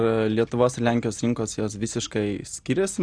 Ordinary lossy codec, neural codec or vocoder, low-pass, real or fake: Opus, 64 kbps; none; 9.9 kHz; real